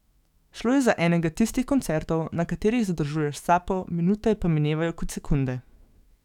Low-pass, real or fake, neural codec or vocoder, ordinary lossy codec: 19.8 kHz; fake; autoencoder, 48 kHz, 128 numbers a frame, DAC-VAE, trained on Japanese speech; none